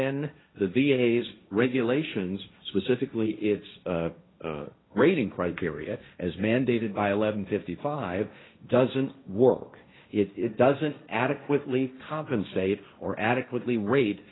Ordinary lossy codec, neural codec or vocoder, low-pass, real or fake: AAC, 16 kbps; codec, 16 kHz, 1.1 kbps, Voila-Tokenizer; 7.2 kHz; fake